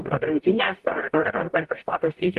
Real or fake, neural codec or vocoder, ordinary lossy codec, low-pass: fake; codec, 44.1 kHz, 0.9 kbps, DAC; Opus, 24 kbps; 14.4 kHz